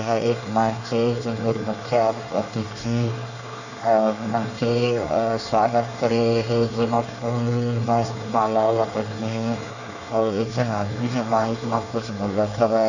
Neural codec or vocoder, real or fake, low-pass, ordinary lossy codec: codec, 24 kHz, 1 kbps, SNAC; fake; 7.2 kHz; none